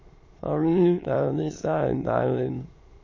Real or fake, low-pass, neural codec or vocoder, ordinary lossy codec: fake; 7.2 kHz; autoencoder, 22.05 kHz, a latent of 192 numbers a frame, VITS, trained on many speakers; MP3, 32 kbps